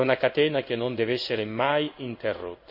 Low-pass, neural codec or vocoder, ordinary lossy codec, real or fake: 5.4 kHz; codec, 16 kHz in and 24 kHz out, 1 kbps, XY-Tokenizer; none; fake